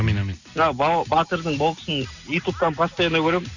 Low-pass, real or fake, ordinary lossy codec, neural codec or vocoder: 7.2 kHz; real; none; none